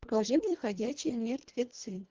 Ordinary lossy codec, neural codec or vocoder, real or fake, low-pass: Opus, 16 kbps; codec, 24 kHz, 1.5 kbps, HILCodec; fake; 7.2 kHz